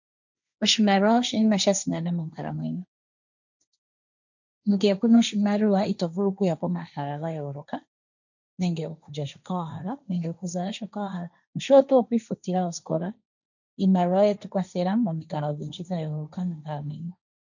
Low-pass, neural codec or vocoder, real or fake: 7.2 kHz; codec, 16 kHz, 1.1 kbps, Voila-Tokenizer; fake